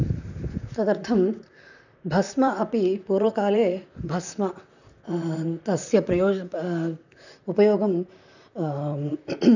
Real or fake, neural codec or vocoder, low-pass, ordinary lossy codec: fake; vocoder, 44.1 kHz, 128 mel bands, Pupu-Vocoder; 7.2 kHz; none